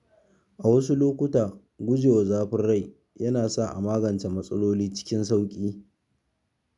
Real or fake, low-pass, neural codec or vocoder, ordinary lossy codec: real; 10.8 kHz; none; none